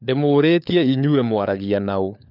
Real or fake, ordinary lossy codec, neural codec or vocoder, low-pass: fake; none; codec, 16 kHz, 4 kbps, FunCodec, trained on LibriTTS, 50 frames a second; 5.4 kHz